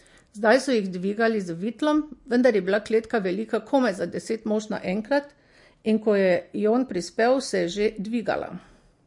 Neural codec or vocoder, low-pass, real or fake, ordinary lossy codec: none; 10.8 kHz; real; MP3, 48 kbps